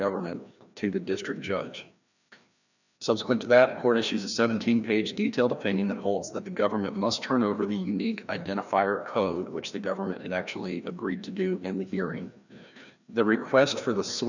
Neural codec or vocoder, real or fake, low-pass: codec, 16 kHz, 1 kbps, FreqCodec, larger model; fake; 7.2 kHz